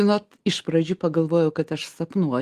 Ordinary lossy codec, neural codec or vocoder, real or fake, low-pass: Opus, 24 kbps; none; real; 14.4 kHz